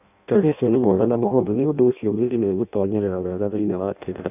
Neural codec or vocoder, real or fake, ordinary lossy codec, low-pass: codec, 16 kHz in and 24 kHz out, 0.6 kbps, FireRedTTS-2 codec; fake; none; 3.6 kHz